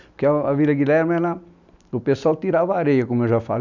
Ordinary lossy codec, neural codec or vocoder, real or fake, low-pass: none; none; real; 7.2 kHz